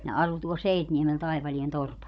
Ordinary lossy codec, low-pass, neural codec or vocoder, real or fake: none; none; codec, 16 kHz, 16 kbps, FunCodec, trained on Chinese and English, 50 frames a second; fake